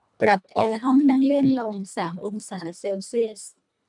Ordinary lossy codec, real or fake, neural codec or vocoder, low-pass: MP3, 96 kbps; fake; codec, 24 kHz, 1.5 kbps, HILCodec; 10.8 kHz